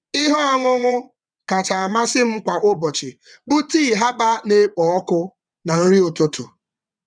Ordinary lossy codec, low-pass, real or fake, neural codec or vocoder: none; 9.9 kHz; fake; vocoder, 22.05 kHz, 80 mel bands, WaveNeXt